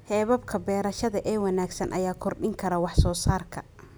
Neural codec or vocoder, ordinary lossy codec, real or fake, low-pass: none; none; real; none